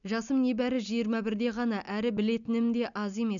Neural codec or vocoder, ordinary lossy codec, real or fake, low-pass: none; none; real; 7.2 kHz